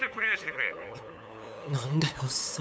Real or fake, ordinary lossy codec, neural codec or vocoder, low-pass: fake; none; codec, 16 kHz, 8 kbps, FunCodec, trained on LibriTTS, 25 frames a second; none